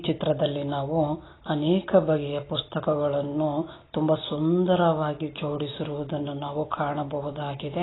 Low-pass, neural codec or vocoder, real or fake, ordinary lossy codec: 7.2 kHz; none; real; AAC, 16 kbps